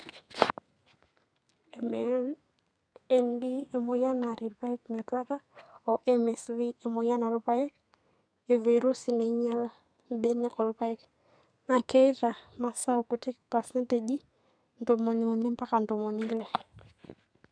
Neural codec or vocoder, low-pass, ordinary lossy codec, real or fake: codec, 32 kHz, 1.9 kbps, SNAC; 9.9 kHz; none; fake